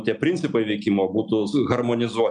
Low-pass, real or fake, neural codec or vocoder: 9.9 kHz; real; none